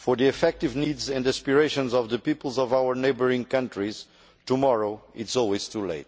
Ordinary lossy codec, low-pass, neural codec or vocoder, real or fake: none; none; none; real